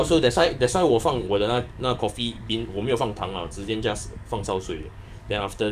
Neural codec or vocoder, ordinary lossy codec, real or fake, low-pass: codec, 44.1 kHz, 7.8 kbps, DAC; none; fake; 14.4 kHz